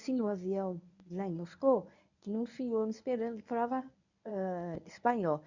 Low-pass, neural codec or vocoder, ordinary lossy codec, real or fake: 7.2 kHz; codec, 24 kHz, 0.9 kbps, WavTokenizer, medium speech release version 1; none; fake